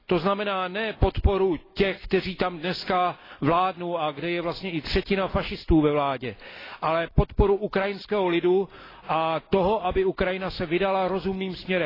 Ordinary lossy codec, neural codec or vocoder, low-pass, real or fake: AAC, 24 kbps; none; 5.4 kHz; real